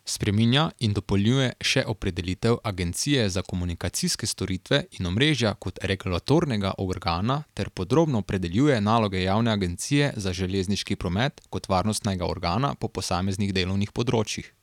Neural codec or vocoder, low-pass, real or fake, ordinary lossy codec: none; 19.8 kHz; real; none